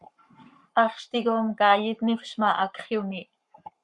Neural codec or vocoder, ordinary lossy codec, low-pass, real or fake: codec, 44.1 kHz, 7.8 kbps, Pupu-Codec; Opus, 64 kbps; 10.8 kHz; fake